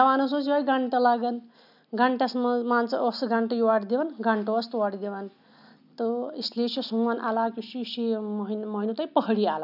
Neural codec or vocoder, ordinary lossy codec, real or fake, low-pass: none; none; real; 5.4 kHz